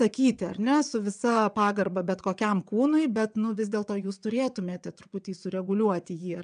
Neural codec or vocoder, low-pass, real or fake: vocoder, 22.05 kHz, 80 mel bands, WaveNeXt; 9.9 kHz; fake